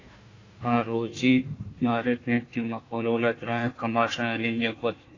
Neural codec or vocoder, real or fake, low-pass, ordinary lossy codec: codec, 16 kHz, 1 kbps, FunCodec, trained on Chinese and English, 50 frames a second; fake; 7.2 kHz; AAC, 32 kbps